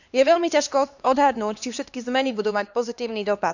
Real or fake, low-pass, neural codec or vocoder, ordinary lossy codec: fake; 7.2 kHz; codec, 16 kHz, 2 kbps, X-Codec, HuBERT features, trained on LibriSpeech; none